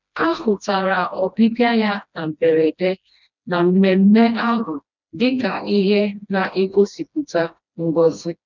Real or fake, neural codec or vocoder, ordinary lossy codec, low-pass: fake; codec, 16 kHz, 1 kbps, FreqCodec, smaller model; none; 7.2 kHz